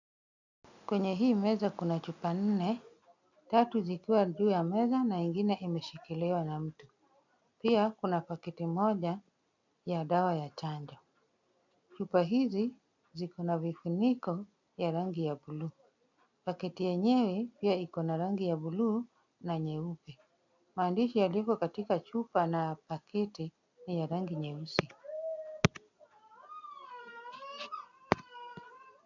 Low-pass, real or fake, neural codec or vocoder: 7.2 kHz; real; none